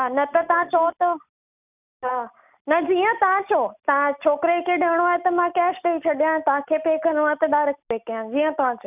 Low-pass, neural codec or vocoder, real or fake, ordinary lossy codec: 3.6 kHz; none; real; none